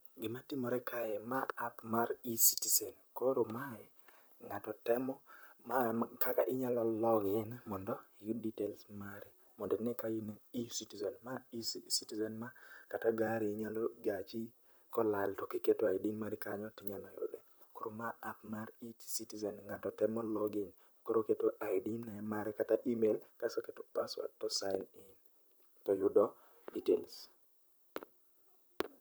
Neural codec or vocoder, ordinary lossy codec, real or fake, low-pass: vocoder, 44.1 kHz, 128 mel bands, Pupu-Vocoder; none; fake; none